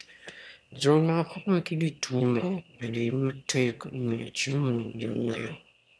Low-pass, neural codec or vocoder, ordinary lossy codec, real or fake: none; autoencoder, 22.05 kHz, a latent of 192 numbers a frame, VITS, trained on one speaker; none; fake